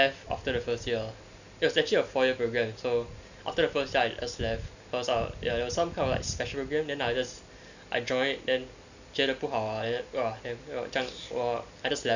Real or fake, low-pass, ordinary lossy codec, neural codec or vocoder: real; 7.2 kHz; none; none